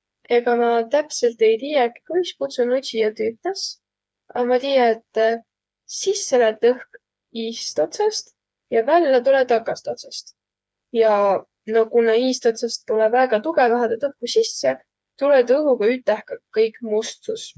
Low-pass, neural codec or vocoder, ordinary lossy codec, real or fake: none; codec, 16 kHz, 4 kbps, FreqCodec, smaller model; none; fake